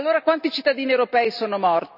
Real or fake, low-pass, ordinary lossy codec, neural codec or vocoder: real; 5.4 kHz; none; none